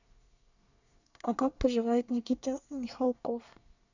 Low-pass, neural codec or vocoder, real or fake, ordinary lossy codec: 7.2 kHz; codec, 24 kHz, 1 kbps, SNAC; fake; none